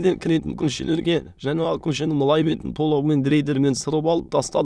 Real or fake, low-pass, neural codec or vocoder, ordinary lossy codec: fake; none; autoencoder, 22.05 kHz, a latent of 192 numbers a frame, VITS, trained on many speakers; none